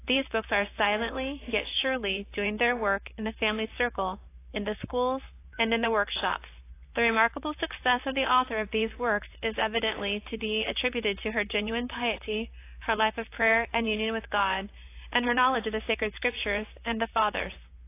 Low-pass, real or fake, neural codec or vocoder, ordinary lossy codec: 3.6 kHz; fake; vocoder, 44.1 kHz, 128 mel bands, Pupu-Vocoder; AAC, 24 kbps